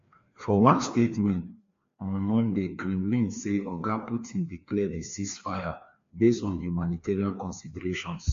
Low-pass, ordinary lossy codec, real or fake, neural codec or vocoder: 7.2 kHz; MP3, 48 kbps; fake; codec, 16 kHz, 2 kbps, FreqCodec, larger model